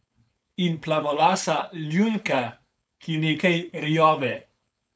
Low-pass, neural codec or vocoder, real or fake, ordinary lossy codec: none; codec, 16 kHz, 4.8 kbps, FACodec; fake; none